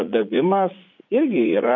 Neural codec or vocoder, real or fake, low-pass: vocoder, 44.1 kHz, 80 mel bands, Vocos; fake; 7.2 kHz